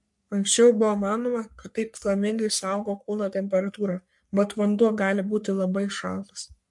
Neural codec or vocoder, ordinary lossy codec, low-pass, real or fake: codec, 44.1 kHz, 3.4 kbps, Pupu-Codec; MP3, 64 kbps; 10.8 kHz; fake